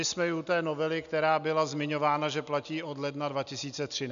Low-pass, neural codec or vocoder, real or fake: 7.2 kHz; none; real